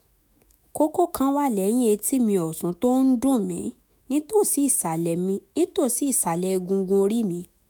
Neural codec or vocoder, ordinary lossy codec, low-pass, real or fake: autoencoder, 48 kHz, 128 numbers a frame, DAC-VAE, trained on Japanese speech; none; none; fake